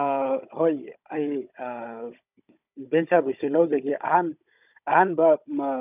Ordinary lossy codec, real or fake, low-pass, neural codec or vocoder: none; fake; 3.6 kHz; codec, 16 kHz, 16 kbps, FunCodec, trained on Chinese and English, 50 frames a second